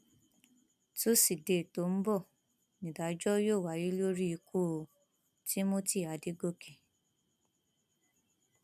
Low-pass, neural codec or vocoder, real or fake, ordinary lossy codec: 14.4 kHz; none; real; none